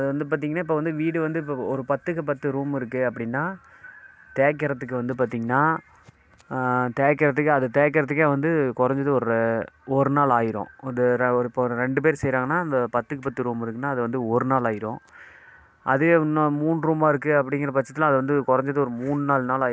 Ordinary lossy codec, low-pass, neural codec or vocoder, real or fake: none; none; none; real